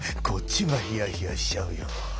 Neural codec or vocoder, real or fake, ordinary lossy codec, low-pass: none; real; none; none